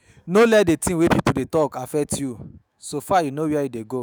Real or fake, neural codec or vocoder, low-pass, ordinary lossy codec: fake; autoencoder, 48 kHz, 128 numbers a frame, DAC-VAE, trained on Japanese speech; none; none